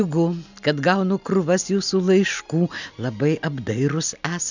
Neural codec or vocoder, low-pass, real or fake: none; 7.2 kHz; real